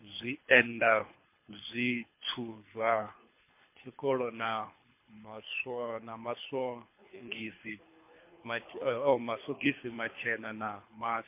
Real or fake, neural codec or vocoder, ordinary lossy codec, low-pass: fake; codec, 24 kHz, 3 kbps, HILCodec; MP3, 24 kbps; 3.6 kHz